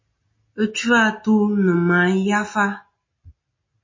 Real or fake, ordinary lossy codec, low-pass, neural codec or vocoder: real; MP3, 32 kbps; 7.2 kHz; none